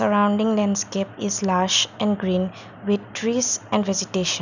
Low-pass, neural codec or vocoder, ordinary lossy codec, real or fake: 7.2 kHz; none; none; real